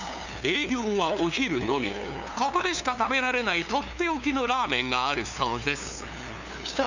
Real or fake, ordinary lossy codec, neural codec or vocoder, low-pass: fake; none; codec, 16 kHz, 2 kbps, FunCodec, trained on LibriTTS, 25 frames a second; 7.2 kHz